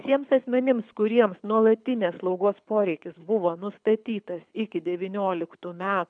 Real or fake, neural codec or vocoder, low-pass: fake; codec, 24 kHz, 6 kbps, HILCodec; 9.9 kHz